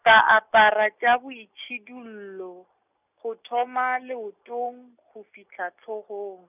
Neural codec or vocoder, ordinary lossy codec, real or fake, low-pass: none; none; real; 3.6 kHz